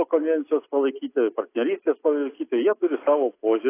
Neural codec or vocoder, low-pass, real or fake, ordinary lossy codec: none; 3.6 kHz; real; AAC, 24 kbps